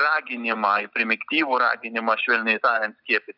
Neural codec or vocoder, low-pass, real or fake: none; 5.4 kHz; real